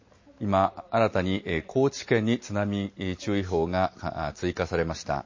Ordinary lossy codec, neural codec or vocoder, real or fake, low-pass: MP3, 32 kbps; none; real; 7.2 kHz